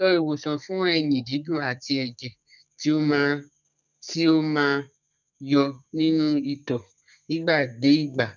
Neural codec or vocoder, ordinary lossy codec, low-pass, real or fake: codec, 32 kHz, 1.9 kbps, SNAC; none; 7.2 kHz; fake